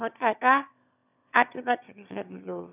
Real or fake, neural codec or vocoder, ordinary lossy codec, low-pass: fake; autoencoder, 22.05 kHz, a latent of 192 numbers a frame, VITS, trained on one speaker; none; 3.6 kHz